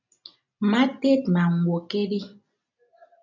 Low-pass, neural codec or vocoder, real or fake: 7.2 kHz; none; real